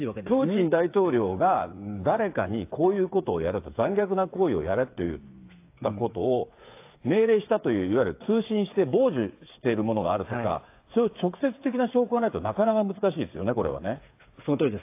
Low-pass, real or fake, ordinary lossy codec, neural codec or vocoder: 3.6 kHz; fake; AAC, 24 kbps; codec, 16 kHz, 8 kbps, FreqCodec, smaller model